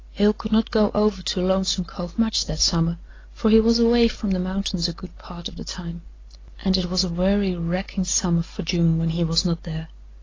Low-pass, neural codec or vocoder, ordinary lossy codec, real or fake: 7.2 kHz; none; AAC, 32 kbps; real